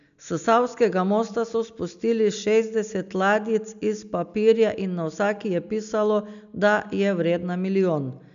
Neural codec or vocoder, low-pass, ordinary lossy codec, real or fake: none; 7.2 kHz; none; real